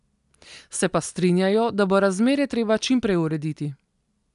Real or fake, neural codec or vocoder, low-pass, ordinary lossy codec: real; none; 10.8 kHz; none